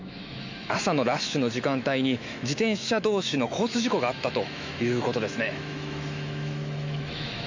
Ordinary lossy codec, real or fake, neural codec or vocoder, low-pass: MP3, 64 kbps; fake; autoencoder, 48 kHz, 128 numbers a frame, DAC-VAE, trained on Japanese speech; 7.2 kHz